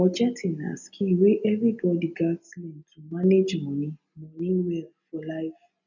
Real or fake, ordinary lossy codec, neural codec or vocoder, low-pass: real; none; none; 7.2 kHz